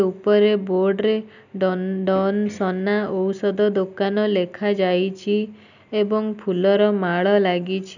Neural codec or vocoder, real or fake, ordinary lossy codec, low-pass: none; real; none; 7.2 kHz